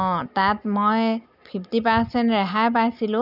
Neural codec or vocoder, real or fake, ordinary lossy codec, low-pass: none; real; none; 5.4 kHz